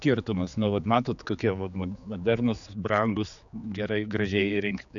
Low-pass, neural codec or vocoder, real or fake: 7.2 kHz; codec, 16 kHz, 4 kbps, X-Codec, HuBERT features, trained on general audio; fake